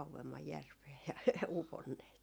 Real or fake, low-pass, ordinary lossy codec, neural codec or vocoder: real; none; none; none